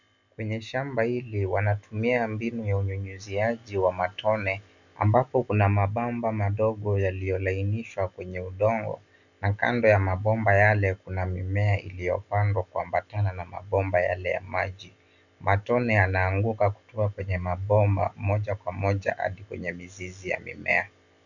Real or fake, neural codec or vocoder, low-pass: real; none; 7.2 kHz